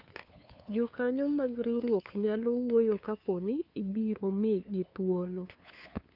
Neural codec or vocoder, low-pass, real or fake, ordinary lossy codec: codec, 16 kHz, 2 kbps, FunCodec, trained on LibriTTS, 25 frames a second; 5.4 kHz; fake; none